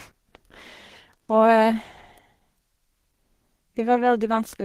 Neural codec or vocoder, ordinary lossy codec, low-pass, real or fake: codec, 32 kHz, 1.9 kbps, SNAC; Opus, 16 kbps; 14.4 kHz; fake